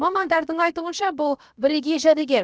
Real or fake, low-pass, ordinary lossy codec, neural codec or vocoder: fake; none; none; codec, 16 kHz, about 1 kbps, DyCAST, with the encoder's durations